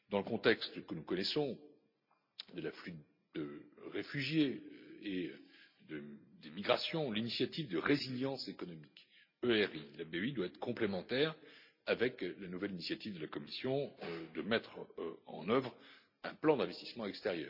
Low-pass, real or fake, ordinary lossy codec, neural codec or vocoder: 5.4 kHz; real; MP3, 48 kbps; none